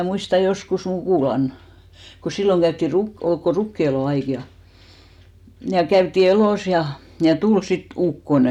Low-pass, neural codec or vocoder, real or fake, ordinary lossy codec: 19.8 kHz; none; real; none